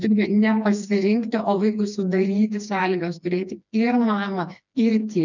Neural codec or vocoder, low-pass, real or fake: codec, 16 kHz, 2 kbps, FreqCodec, smaller model; 7.2 kHz; fake